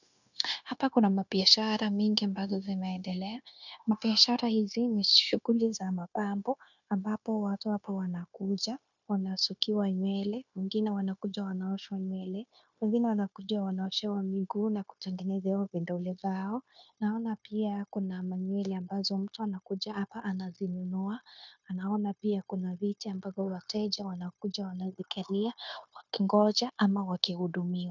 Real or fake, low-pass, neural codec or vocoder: fake; 7.2 kHz; codec, 16 kHz, 0.9 kbps, LongCat-Audio-Codec